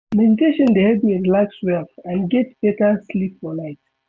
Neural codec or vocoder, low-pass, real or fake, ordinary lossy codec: none; none; real; none